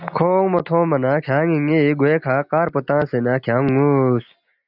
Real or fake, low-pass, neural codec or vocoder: real; 5.4 kHz; none